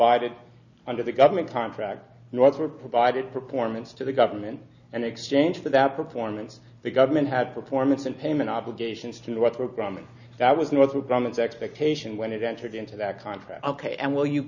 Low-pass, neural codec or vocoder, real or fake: 7.2 kHz; none; real